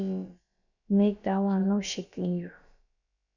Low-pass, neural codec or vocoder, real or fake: 7.2 kHz; codec, 16 kHz, about 1 kbps, DyCAST, with the encoder's durations; fake